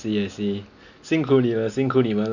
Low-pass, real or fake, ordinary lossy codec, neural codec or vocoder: 7.2 kHz; real; none; none